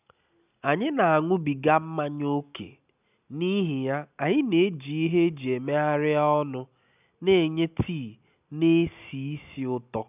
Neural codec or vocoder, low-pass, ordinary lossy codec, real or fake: none; 3.6 kHz; none; real